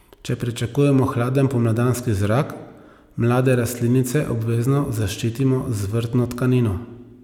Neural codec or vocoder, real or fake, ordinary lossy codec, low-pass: none; real; none; 19.8 kHz